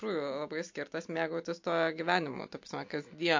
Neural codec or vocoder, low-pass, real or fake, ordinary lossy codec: none; 7.2 kHz; real; MP3, 48 kbps